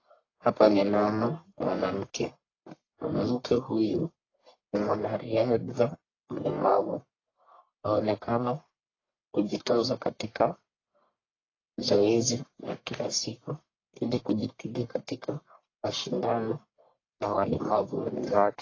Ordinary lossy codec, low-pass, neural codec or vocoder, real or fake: AAC, 32 kbps; 7.2 kHz; codec, 44.1 kHz, 1.7 kbps, Pupu-Codec; fake